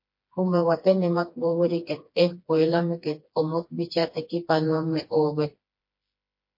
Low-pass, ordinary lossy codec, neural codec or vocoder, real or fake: 5.4 kHz; MP3, 32 kbps; codec, 16 kHz, 2 kbps, FreqCodec, smaller model; fake